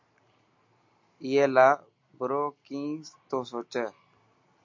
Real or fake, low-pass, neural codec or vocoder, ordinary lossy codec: real; 7.2 kHz; none; MP3, 48 kbps